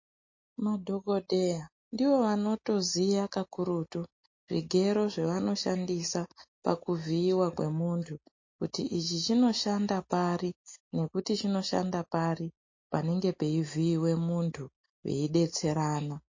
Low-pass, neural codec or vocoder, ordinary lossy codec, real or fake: 7.2 kHz; none; MP3, 32 kbps; real